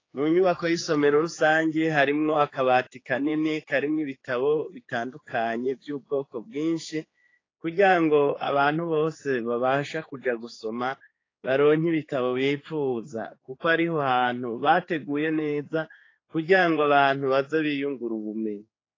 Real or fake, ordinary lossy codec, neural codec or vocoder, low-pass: fake; AAC, 32 kbps; codec, 16 kHz, 4 kbps, X-Codec, HuBERT features, trained on general audio; 7.2 kHz